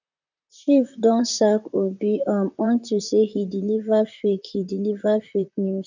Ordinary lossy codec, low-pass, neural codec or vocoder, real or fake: none; 7.2 kHz; vocoder, 44.1 kHz, 128 mel bands, Pupu-Vocoder; fake